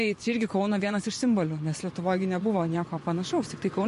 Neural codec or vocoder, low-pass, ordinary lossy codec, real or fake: vocoder, 24 kHz, 100 mel bands, Vocos; 10.8 kHz; MP3, 48 kbps; fake